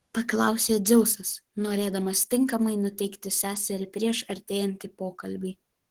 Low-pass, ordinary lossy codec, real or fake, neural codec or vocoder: 19.8 kHz; Opus, 16 kbps; fake; codec, 44.1 kHz, 7.8 kbps, Pupu-Codec